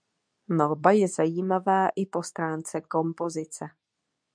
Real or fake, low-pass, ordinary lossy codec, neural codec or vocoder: fake; 9.9 kHz; MP3, 96 kbps; codec, 24 kHz, 0.9 kbps, WavTokenizer, medium speech release version 2